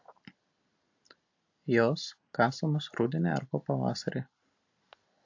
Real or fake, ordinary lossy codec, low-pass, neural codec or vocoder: real; AAC, 48 kbps; 7.2 kHz; none